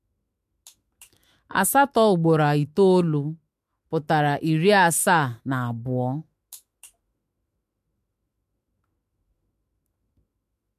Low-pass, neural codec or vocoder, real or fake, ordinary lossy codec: 14.4 kHz; autoencoder, 48 kHz, 128 numbers a frame, DAC-VAE, trained on Japanese speech; fake; MP3, 64 kbps